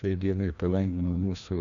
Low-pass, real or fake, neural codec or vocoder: 7.2 kHz; fake; codec, 16 kHz, 1 kbps, FreqCodec, larger model